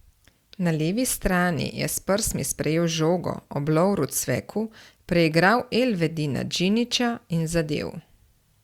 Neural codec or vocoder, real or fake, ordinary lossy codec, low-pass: none; real; Opus, 64 kbps; 19.8 kHz